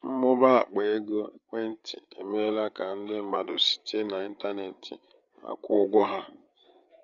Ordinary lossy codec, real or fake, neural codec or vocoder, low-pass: none; fake; codec, 16 kHz, 8 kbps, FreqCodec, larger model; 7.2 kHz